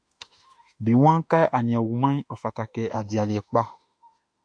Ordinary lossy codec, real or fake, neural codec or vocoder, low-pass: AAC, 64 kbps; fake; autoencoder, 48 kHz, 32 numbers a frame, DAC-VAE, trained on Japanese speech; 9.9 kHz